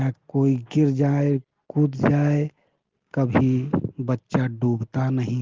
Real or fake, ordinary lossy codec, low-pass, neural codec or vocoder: real; Opus, 16 kbps; 7.2 kHz; none